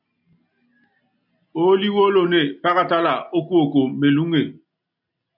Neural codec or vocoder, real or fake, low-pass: none; real; 5.4 kHz